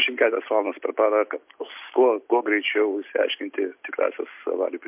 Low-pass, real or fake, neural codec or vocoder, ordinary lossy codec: 3.6 kHz; real; none; MP3, 32 kbps